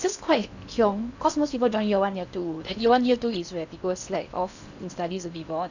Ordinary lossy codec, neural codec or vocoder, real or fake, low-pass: none; codec, 16 kHz in and 24 kHz out, 0.8 kbps, FocalCodec, streaming, 65536 codes; fake; 7.2 kHz